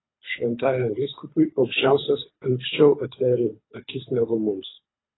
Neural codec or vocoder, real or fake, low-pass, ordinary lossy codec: codec, 24 kHz, 3 kbps, HILCodec; fake; 7.2 kHz; AAC, 16 kbps